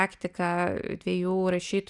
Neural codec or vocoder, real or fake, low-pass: none; real; 10.8 kHz